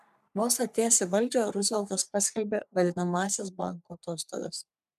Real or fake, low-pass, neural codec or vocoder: fake; 14.4 kHz; codec, 44.1 kHz, 3.4 kbps, Pupu-Codec